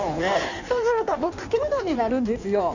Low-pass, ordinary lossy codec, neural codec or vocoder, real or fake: 7.2 kHz; none; codec, 16 kHz in and 24 kHz out, 1.1 kbps, FireRedTTS-2 codec; fake